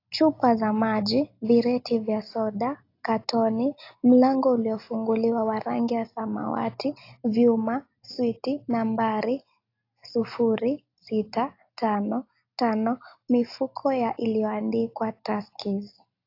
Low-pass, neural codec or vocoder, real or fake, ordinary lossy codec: 5.4 kHz; none; real; AAC, 32 kbps